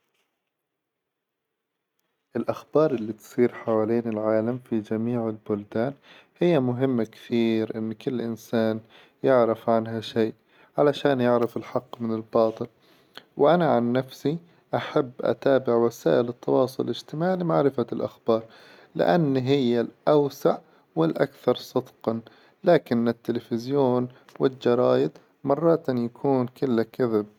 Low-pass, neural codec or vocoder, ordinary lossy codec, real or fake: 19.8 kHz; none; none; real